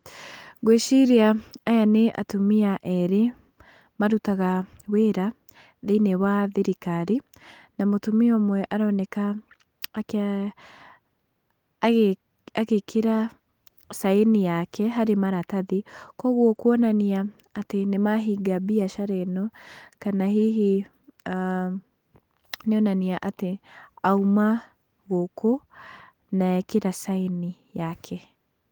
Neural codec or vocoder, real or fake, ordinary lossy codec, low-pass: none; real; Opus, 24 kbps; 19.8 kHz